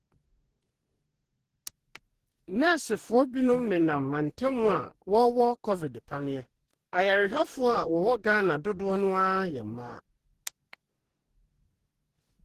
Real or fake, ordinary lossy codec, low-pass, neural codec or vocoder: fake; Opus, 16 kbps; 14.4 kHz; codec, 44.1 kHz, 2.6 kbps, DAC